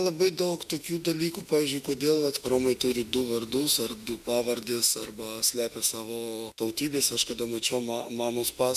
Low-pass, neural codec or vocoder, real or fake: 14.4 kHz; autoencoder, 48 kHz, 32 numbers a frame, DAC-VAE, trained on Japanese speech; fake